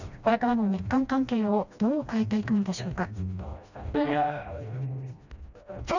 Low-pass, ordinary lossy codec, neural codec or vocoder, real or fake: 7.2 kHz; none; codec, 16 kHz, 1 kbps, FreqCodec, smaller model; fake